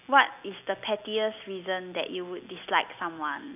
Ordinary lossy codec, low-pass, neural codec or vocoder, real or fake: none; 3.6 kHz; none; real